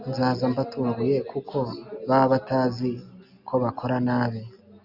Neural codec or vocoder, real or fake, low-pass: none; real; 5.4 kHz